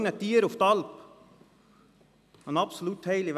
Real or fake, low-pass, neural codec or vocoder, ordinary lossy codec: real; 14.4 kHz; none; none